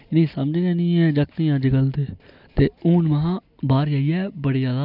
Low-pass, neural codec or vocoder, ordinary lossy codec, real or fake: 5.4 kHz; none; none; real